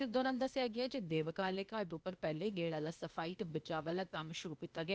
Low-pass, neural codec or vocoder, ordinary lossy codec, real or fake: none; codec, 16 kHz, 0.8 kbps, ZipCodec; none; fake